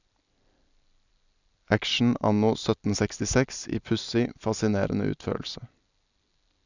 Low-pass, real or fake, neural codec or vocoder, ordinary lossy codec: 7.2 kHz; real; none; none